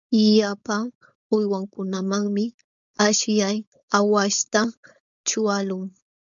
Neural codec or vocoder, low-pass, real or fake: codec, 16 kHz, 4.8 kbps, FACodec; 7.2 kHz; fake